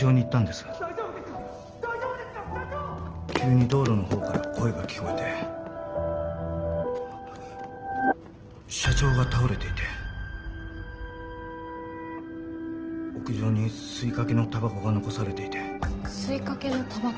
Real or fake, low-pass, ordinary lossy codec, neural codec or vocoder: real; 7.2 kHz; Opus, 16 kbps; none